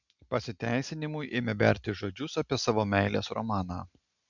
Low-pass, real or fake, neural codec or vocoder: 7.2 kHz; real; none